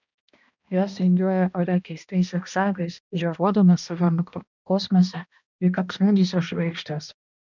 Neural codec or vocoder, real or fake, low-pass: codec, 16 kHz, 1 kbps, X-Codec, HuBERT features, trained on balanced general audio; fake; 7.2 kHz